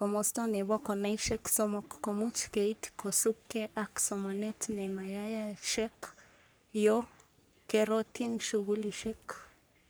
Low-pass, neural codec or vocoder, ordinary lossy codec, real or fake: none; codec, 44.1 kHz, 3.4 kbps, Pupu-Codec; none; fake